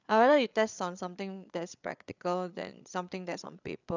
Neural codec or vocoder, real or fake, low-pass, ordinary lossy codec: codec, 16 kHz, 8 kbps, FreqCodec, larger model; fake; 7.2 kHz; none